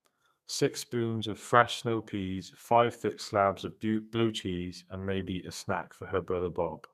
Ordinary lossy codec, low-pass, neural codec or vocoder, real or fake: MP3, 96 kbps; 14.4 kHz; codec, 32 kHz, 1.9 kbps, SNAC; fake